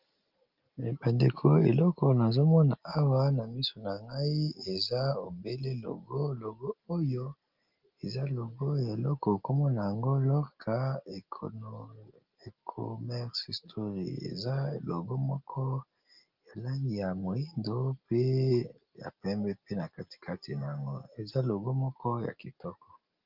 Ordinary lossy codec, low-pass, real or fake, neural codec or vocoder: Opus, 32 kbps; 5.4 kHz; real; none